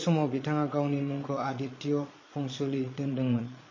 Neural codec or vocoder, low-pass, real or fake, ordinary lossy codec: vocoder, 22.05 kHz, 80 mel bands, Vocos; 7.2 kHz; fake; MP3, 32 kbps